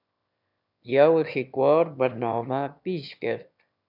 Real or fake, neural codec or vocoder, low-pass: fake; autoencoder, 22.05 kHz, a latent of 192 numbers a frame, VITS, trained on one speaker; 5.4 kHz